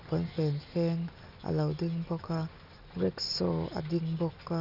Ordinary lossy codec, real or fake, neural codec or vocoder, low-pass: none; real; none; 5.4 kHz